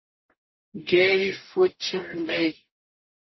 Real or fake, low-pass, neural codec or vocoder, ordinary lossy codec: fake; 7.2 kHz; codec, 44.1 kHz, 0.9 kbps, DAC; MP3, 24 kbps